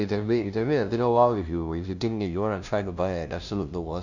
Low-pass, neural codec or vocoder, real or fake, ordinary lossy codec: 7.2 kHz; codec, 16 kHz, 0.5 kbps, FunCodec, trained on LibriTTS, 25 frames a second; fake; none